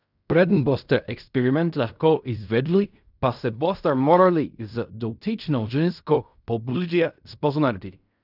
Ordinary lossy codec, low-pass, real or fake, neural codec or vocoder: none; 5.4 kHz; fake; codec, 16 kHz in and 24 kHz out, 0.4 kbps, LongCat-Audio-Codec, fine tuned four codebook decoder